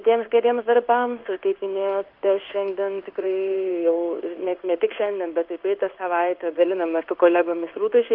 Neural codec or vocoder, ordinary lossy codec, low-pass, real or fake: codec, 16 kHz in and 24 kHz out, 1 kbps, XY-Tokenizer; Opus, 32 kbps; 5.4 kHz; fake